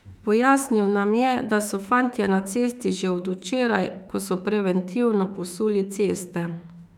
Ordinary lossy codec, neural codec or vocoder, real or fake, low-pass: none; autoencoder, 48 kHz, 32 numbers a frame, DAC-VAE, trained on Japanese speech; fake; 19.8 kHz